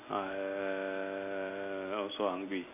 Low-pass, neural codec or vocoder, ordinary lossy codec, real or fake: 3.6 kHz; none; none; real